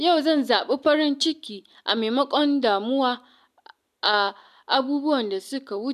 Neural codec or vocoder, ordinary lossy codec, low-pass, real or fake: none; none; 14.4 kHz; real